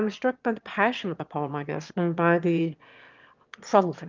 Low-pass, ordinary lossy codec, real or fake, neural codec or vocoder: 7.2 kHz; Opus, 24 kbps; fake; autoencoder, 22.05 kHz, a latent of 192 numbers a frame, VITS, trained on one speaker